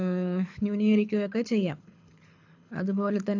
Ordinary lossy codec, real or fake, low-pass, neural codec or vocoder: AAC, 48 kbps; fake; 7.2 kHz; codec, 24 kHz, 6 kbps, HILCodec